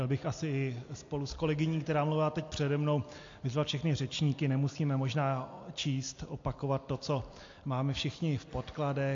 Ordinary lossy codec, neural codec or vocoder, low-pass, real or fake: AAC, 48 kbps; none; 7.2 kHz; real